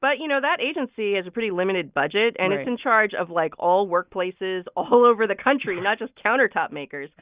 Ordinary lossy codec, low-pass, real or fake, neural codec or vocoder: Opus, 64 kbps; 3.6 kHz; real; none